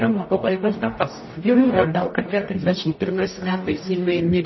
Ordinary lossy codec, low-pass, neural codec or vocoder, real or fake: MP3, 24 kbps; 7.2 kHz; codec, 44.1 kHz, 0.9 kbps, DAC; fake